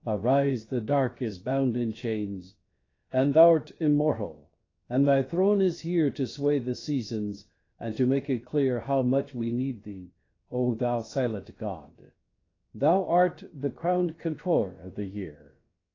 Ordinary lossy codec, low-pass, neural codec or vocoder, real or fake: AAC, 32 kbps; 7.2 kHz; codec, 16 kHz, about 1 kbps, DyCAST, with the encoder's durations; fake